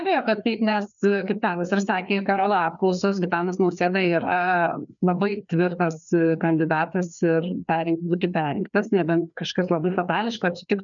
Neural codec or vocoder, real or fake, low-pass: codec, 16 kHz, 2 kbps, FreqCodec, larger model; fake; 7.2 kHz